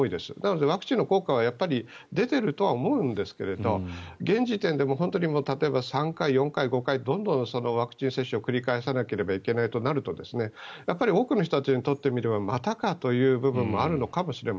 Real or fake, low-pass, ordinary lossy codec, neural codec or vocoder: real; none; none; none